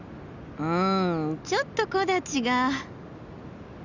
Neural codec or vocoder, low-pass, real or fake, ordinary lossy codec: none; 7.2 kHz; real; none